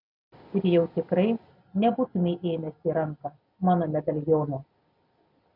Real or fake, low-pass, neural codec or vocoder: real; 5.4 kHz; none